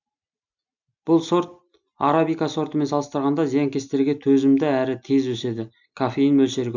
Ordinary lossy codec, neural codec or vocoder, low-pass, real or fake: none; none; 7.2 kHz; real